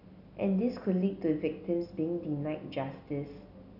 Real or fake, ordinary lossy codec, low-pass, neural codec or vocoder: real; none; 5.4 kHz; none